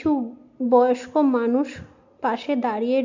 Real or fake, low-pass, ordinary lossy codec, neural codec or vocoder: real; 7.2 kHz; AAC, 48 kbps; none